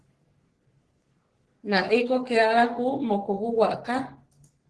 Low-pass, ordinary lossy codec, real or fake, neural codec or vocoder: 10.8 kHz; Opus, 16 kbps; fake; codec, 44.1 kHz, 3.4 kbps, Pupu-Codec